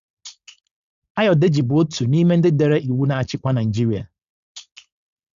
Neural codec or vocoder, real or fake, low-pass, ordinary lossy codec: codec, 16 kHz, 4.8 kbps, FACodec; fake; 7.2 kHz; Opus, 64 kbps